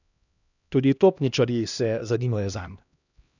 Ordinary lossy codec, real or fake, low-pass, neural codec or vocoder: none; fake; 7.2 kHz; codec, 16 kHz, 1 kbps, X-Codec, HuBERT features, trained on LibriSpeech